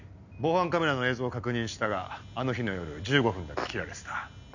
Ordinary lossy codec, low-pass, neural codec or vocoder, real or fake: none; 7.2 kHz; none; real